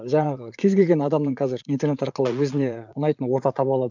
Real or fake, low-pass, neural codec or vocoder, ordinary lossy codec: fake; 7.2 kHz; vocoder, 44.1 kHz, 80 mel bands, Vocos; none